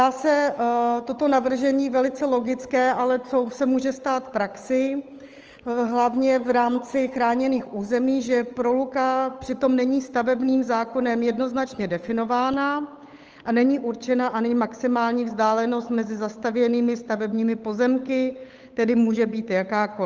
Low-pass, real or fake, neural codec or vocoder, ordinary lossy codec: 7.2 kHz; fake; codec, 16 kHz, 8 kbps, FunCodec, trained on Chinese and English, 25 frames a second; Opus, 24 kbps